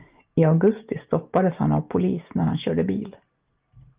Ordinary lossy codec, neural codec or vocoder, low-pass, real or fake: Opus, 32 kbps; none; 3.6 kHz; real